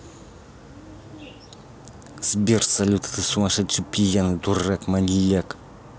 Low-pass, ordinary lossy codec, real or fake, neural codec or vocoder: none; none; real; none